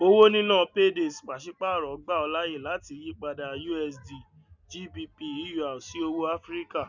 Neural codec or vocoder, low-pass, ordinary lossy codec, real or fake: none; 7.2 kHz; none; real